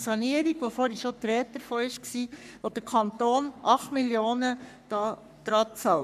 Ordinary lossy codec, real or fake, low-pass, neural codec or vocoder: none; fake; 14.4 kHz; codec, 44.1 kHz, 3.4 kbps, Pupu-Codec